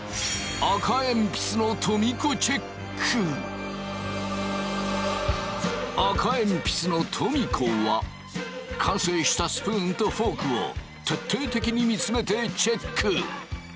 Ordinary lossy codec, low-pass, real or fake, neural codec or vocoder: none; none; real; none